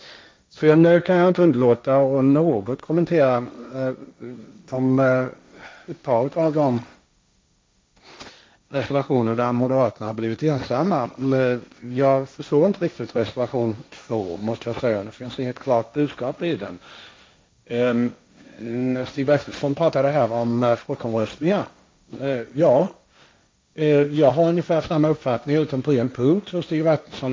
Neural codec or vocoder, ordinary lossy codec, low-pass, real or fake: codec, 16 kHz, 1.1 kbps, Voila-Tokenizer; none; none; fake